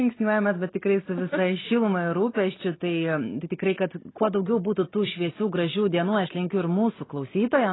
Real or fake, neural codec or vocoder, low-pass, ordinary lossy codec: real; none; 7.2 kHz; AAC, 16 kbps